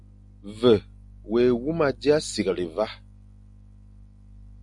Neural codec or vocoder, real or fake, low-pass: none; real; 10.8 kHz